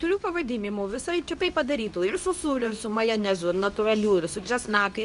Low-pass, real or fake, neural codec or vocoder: 10.8 kHz; fake; codec, 24 kHz, 0.9 kbps, WavTokenizer, medium speech release version 2